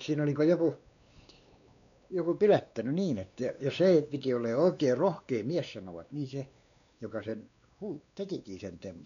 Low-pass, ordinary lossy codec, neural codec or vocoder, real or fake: 7.2 kHz; none; codec, 16 kHz, 2 kbps, X-Codec, WavLM features, trained on Multilingual LibriSpeech; fake